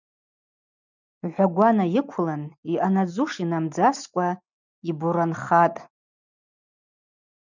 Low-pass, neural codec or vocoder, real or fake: 7.2 kHz; none; real